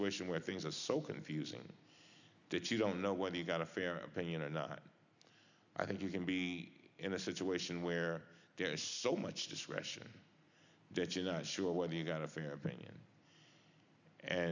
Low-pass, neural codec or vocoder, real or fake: 7.2 kHz; none; real